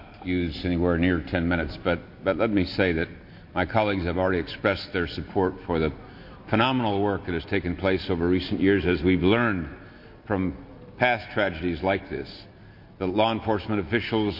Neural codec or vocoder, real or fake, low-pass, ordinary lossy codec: none; real; 5.4 kHz; MP3, 32 kbps